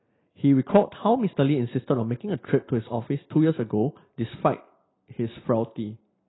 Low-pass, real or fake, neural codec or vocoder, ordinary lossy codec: 7.2 kHz; real; none; AAC, 16 kbps